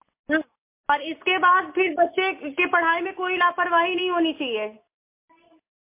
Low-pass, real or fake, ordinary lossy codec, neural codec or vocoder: 3.6 kHz; real; MP3, 24 kbps; none